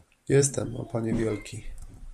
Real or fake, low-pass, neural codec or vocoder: fake; 14.4 kHz; vocoder, 44.1 kHz, 128 mel bands every 512 samples, BigVGAN v2